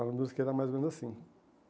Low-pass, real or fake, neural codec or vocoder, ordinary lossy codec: none; real; none; none